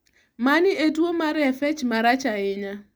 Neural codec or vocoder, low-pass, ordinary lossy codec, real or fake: none; none; none; real